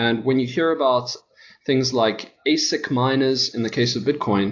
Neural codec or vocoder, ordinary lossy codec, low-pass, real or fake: none; AAC, 48 kbps; 7.2 kHz; real